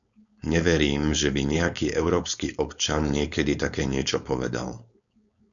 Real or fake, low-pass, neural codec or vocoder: fake; 7.2 kHz; codec, 16 kHz, 4.8 kbps, FACodec